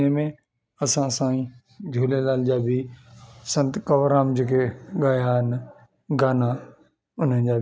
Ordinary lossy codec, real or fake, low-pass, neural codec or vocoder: none; real; none; none